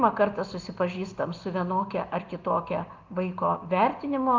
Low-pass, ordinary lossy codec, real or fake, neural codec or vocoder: 7.2 kHz; Opus, 32 kbps; real; none